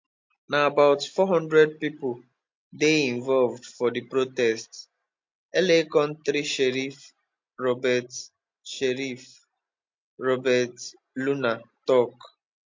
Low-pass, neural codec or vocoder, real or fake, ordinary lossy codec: 7.2 kHz; none; real; MP3, 48 kbps